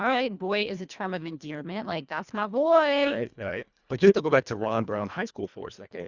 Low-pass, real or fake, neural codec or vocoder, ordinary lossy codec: 7.2 kHz; fake; codec, 24 kHz, 1.5 kbps, HILCodec; Opus, 64 kbps